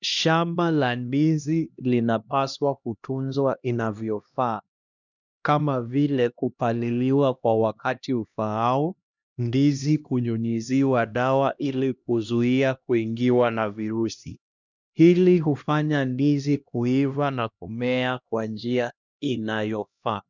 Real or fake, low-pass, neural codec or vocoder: fake; 7.2 kHz; codec, 16 kHz, 1 kbps, X-Codec, HuBERT features, trained on LibriSpeech